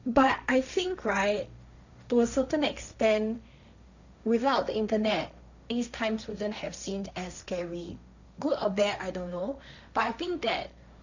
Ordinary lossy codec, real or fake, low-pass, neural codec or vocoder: none; fake; 7.2 kHz; codec, 16 kHz, 1.1 kbps, Voila-Tokenizer